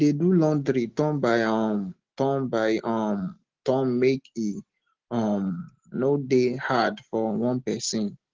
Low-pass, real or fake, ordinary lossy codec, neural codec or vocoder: 7.2 kHz; real; Opus, 16 kbps; none